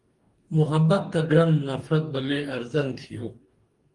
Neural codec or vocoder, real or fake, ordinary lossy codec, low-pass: codec, 44.1 kHz, 2.6 kbps, DAC; fake; Opus, 24 kbps; 10.8 kHz